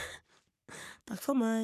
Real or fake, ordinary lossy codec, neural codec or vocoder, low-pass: fake; none; codec, 44.1 kHz, 3.4 kbps, Pupu-Codec; 14.4 kHz